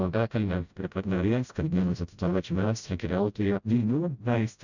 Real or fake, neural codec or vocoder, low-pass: fake; codec, 16 kHz, 0.5 kbps, FreqCodec, smaller model; 7.2 kHz